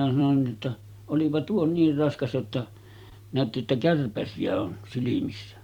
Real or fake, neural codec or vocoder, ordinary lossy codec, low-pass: fake; vocoder, 44.1 kHz, 128 mel bands every 256 samples, BigVGAN v2; Opus, 64 kbps; 19.8 kHz